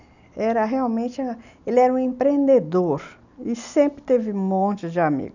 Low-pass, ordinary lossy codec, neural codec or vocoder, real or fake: 7.2 kHz; none; none; real